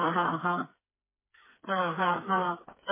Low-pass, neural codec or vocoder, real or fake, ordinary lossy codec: 3.6 kHz; codec, 16 kHz, 4 kbps, FreqCodec, smaller model; fake; MP3, 16 kbps